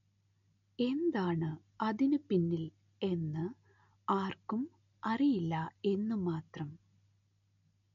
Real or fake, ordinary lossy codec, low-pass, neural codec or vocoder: real; none; 7.2 kHz; none